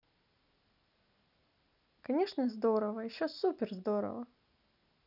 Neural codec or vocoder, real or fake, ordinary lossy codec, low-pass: none; real; none; 5.4 kHz